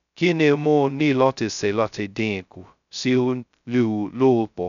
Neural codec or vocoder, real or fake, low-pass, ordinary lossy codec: codec, 16 kHz, 0.2 kbps, FocalCodec; fake; 7.2 kHz; none